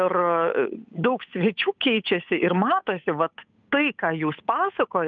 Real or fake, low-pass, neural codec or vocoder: fake; 7.2 kHz; codec, 16 kHz, 8 kbps, FunCodec, trained on Chinese and English, 25 frames a second